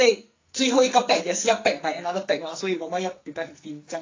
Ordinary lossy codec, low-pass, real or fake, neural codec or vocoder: AAC, 32 kbps; 7.2 kHz; fake; codec, 44.1 kHz, 2.6 kbps, SNAC